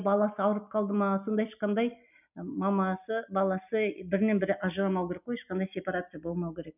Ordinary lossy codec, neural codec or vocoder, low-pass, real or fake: none; none; 3.6 kHz; real